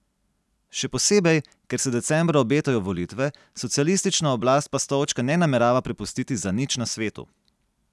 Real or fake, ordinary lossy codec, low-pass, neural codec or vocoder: real; none; none; none